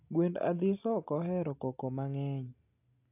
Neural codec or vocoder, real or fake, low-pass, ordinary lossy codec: none; real; 3.6 kHz; AAC, 32 kbps